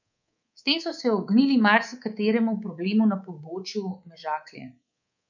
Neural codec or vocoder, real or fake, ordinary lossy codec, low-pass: codec, 24 kHz, 3.1 kbps, DualCodec; fake; none; 7.2 kHz